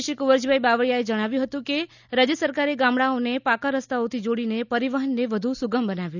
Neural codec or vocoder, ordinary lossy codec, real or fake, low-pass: none; none; real; 7.2 kHz